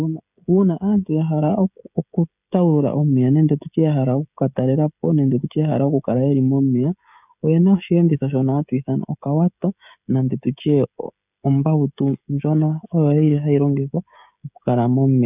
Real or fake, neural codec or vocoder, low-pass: fake; codec, 16 kHz, 16 kbps, FreqCodec, smaller model; 3.6 kHz